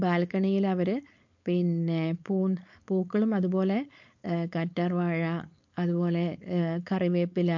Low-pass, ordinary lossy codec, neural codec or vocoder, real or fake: 7.2 kHz; MP3, 48 kbps; codec, 16 kHz, 4.8 kbps, FACodec; fake